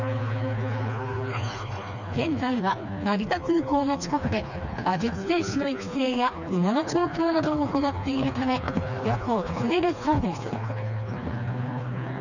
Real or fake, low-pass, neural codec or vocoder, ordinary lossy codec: fake; 7.2 kHz; codec, 16 kHz, 2 kbps, FreqCodec, smaller model; none